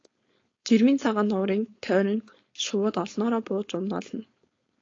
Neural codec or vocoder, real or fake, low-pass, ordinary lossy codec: codec, 16 kHz, 4.8 kbps, FACodec; fake; 7.2 kHz; AAC, 32 kbps